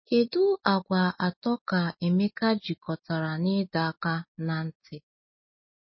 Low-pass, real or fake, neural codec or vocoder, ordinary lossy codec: 7.2 kHz; real; none; MP3, 24 kbps